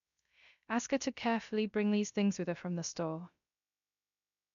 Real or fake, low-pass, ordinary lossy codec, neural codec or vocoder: fake; 7.2 kHz; none; codec, 16 kHz, 0.3 kbps, FocalCodec